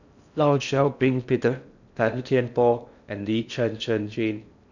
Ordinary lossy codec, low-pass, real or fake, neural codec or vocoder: none; 7.2 kHz; fake; codec, 16 kHz in and 24 kHz out, 0.6 kbps, FocalCodec, streaming, 4096 codes